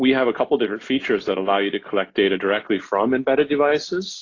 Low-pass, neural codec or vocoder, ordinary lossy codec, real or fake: 7.2 kHz; none; AAC, 32 kbps; real